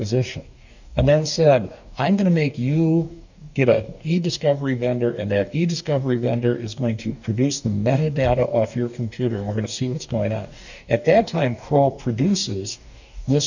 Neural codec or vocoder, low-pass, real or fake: codec, 44.1 kHz, 2.6 kbps, DAC; 7.2 kHz; fake